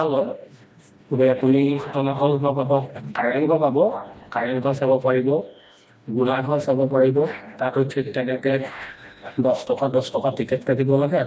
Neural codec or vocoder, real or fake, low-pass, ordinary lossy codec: codec, 16 kHz, 1 kbps, FreqCodec, smaller model; fake; none; none